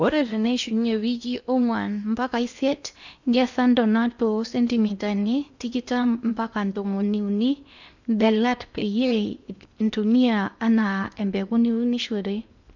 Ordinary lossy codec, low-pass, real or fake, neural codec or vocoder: none; 7.2 kHz; fake; codec, 16 kHz in and 24 kHz out, 0.8 kbps, FocalCodec, streaming, 65536 codes